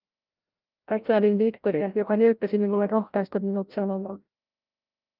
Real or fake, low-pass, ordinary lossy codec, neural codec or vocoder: fake; 5.4 kHz; Opus, 24 kbps; codec, 16 kHz, 0.5 kbps, FreqCodec, larger model